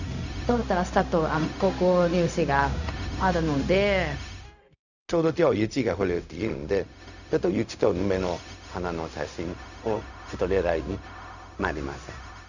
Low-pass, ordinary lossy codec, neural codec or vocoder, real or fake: 7.2 kHz; none; codec, 16 kHz, 0.4 kbps, LongCat-Audio-Codec; fake